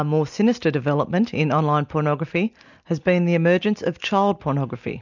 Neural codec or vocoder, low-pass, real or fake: none; 7.2 kHz; real